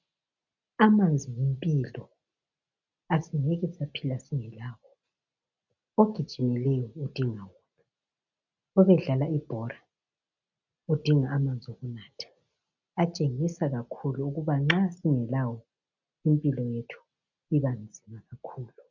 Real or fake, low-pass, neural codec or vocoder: real; 7.2 kHz; none